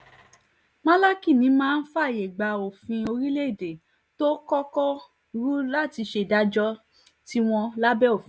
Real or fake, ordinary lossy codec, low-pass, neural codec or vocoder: real; none; none; none